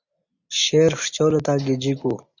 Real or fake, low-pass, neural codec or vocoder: real; 7.2 kHz; none